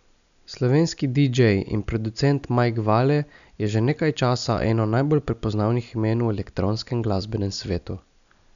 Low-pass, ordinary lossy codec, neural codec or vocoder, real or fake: 7.2 kHz; none; none; real